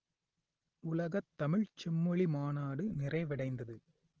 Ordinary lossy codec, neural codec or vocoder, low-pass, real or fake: Opus, 16 kbps; none; 7.2 kHz; real